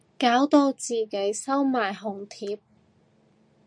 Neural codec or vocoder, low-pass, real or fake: none; 9.9 kHz; real